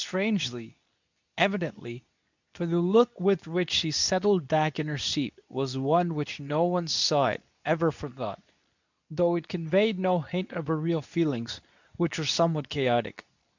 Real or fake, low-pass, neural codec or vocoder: fake; 7.2 kHz; codec, 24 kHz, 0.9 kbps, WavTokenizer, medium speech release version 2